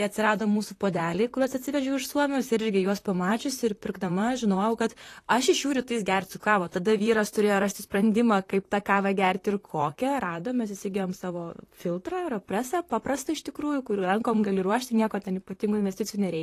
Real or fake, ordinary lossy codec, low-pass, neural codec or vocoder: fake; AAC, 48 kbps; 14.4 kHz; vocoder, 44.1 kHz, 128 mel bands, Pupu-Vocoder